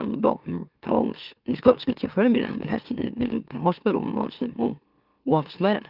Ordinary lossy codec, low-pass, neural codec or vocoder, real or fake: Opus, 32 kbps; 5.4 kHz; autoencoder, 44.1 kHz, a latent of 192 numbers a frame, MeloTTS; fake